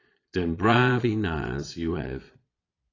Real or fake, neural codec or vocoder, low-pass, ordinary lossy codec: fake; vocoder, 22.05 kHz, 80 mel bands, Vocos; 7.2 kHz; AAC, 32 kbps